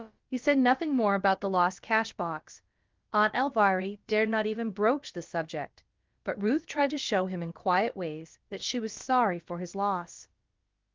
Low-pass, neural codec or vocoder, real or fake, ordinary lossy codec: 7.2 kHz; codec, 16 kHz, about 1 kbps, DyCAST, with the encoder's durations; fake; Opus, 24 kbps